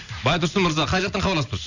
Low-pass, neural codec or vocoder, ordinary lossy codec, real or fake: 7.2 kHz; none; none; real